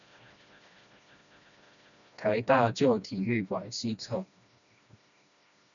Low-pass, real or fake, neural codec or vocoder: 7.2 kHz; fake; codec, 16 kHz, 1 kbps, FreqCodec, smaller model